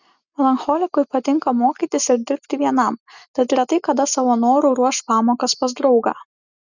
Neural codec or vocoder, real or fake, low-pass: none; real; 7.2 kHz